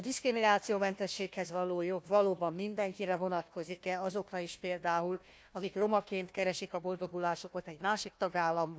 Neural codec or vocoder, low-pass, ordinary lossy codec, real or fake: codec, 16 kHz, 1 kbps, FunCodec, trained on Chinese and English, 50 frames a second; none; none; fake